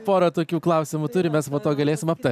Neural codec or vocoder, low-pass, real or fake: none; 14.4 kHz; real